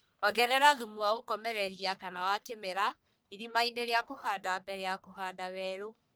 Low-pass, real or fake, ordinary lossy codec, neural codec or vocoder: none; fake; none; codec, 44.1 kHz, 1.7 kbps, Pupu-Codec